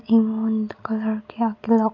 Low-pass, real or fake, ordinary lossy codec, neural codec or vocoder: 7.2 kHz; real; none; none